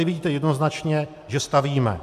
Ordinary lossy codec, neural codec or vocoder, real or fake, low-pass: AAC, 96 kbps; none; real; 14.4 kHz